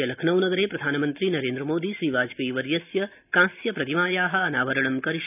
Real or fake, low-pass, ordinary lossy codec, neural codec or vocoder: real; 3.6 kHz; none; none